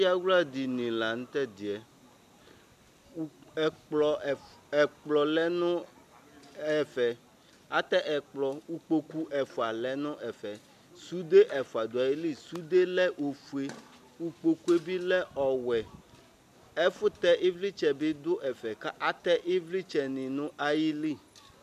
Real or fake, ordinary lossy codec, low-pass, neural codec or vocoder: real; MP3, 96 kbps; 14.4 kHz; none